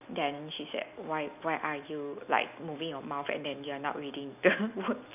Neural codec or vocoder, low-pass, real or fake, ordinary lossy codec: none; 3.6 kHz; real; none